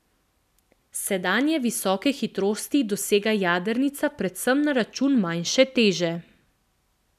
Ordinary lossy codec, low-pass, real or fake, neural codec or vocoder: none; 14.4 kHz; real; none